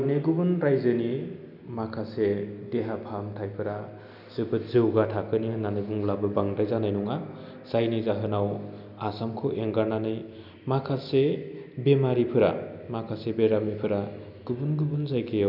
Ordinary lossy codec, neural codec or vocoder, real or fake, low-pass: none; none; real; 5.4 kHz